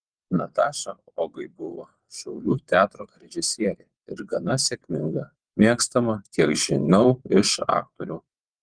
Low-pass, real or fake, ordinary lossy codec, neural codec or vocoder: 9.9 kHz; fake; Opus, 24 kbps; vocoder, 22.05 kHz, 80 mel bands, WaveNeXt